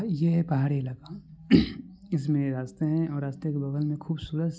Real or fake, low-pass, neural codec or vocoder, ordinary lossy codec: real; none; none; none